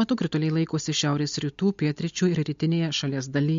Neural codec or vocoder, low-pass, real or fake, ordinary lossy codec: none; 7.2 kHz; real; MP3, 48 kbps